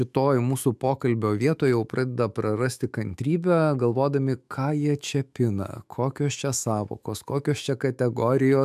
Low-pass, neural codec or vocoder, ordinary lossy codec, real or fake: 14.4 kHz; autoencoder, 48 kHz, 128 numbers a frame, DAC-VAE, trained on Japanese speech; AAC, 96 kbps; fake